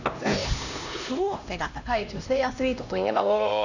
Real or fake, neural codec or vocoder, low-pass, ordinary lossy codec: fake; codec, 16 kHz, 1 kbps, X-Codec, HuBERT features, trained on LibriSpeech; 7.2 kHz; none